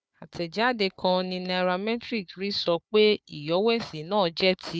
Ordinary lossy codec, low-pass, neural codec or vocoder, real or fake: none; none; codec, 16 kHz, 4 kbps, FunCodec, trained on Chinese and English, 50 frames a second; fake